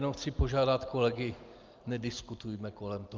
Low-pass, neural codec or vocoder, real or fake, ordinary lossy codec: 7.2 kHz; none; real; Opus, 32 kbps